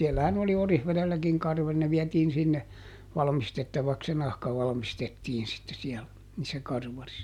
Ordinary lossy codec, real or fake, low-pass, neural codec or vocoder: none; real; 19.8 kHz; none